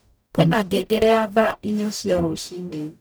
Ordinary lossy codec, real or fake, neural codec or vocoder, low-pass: none; fake; codec, 44.1 kHz, 0.9 kbps, DAC; none